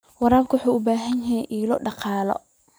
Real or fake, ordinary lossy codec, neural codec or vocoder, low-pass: real; none; none; none